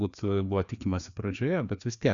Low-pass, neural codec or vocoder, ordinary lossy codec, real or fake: 7.2 kHz; codec, 16 kHz, 2 kbps, FreqCodec, larger model; Opus, 64 kbps; fake